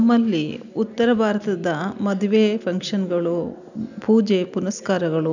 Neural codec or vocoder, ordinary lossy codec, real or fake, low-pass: none; none; real; 7.2 kHz